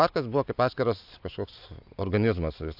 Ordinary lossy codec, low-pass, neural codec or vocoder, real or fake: AAC, 48 kbps; 5.4 kHz; none; real